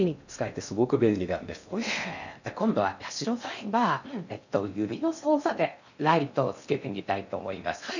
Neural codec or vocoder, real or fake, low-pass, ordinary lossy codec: codec, 16 kHz in and 24 kHz out, 0.6 kbps, FocalCodec, streaming, 2048 codes; fake; 7.2 kHz; none